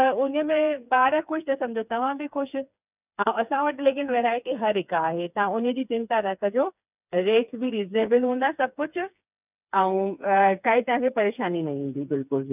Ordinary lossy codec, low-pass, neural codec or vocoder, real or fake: none; 3.6 kHz; codec, 16 kHz, 4 kbps, FreqCodec, smaller model; fake